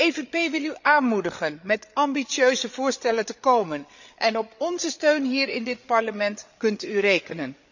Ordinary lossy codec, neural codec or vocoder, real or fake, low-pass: none; codec, 16 kHz, 8 kbps, FreqCodec, larger model; fake; 7.2 kHz